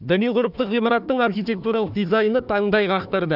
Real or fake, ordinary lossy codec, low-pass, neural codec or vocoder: fake; AAC, 48 kbps; 5.4 kHz; codec, 16 kHz, 1 kbps, FunCodec, trained on Chinese and English, 50 frames a second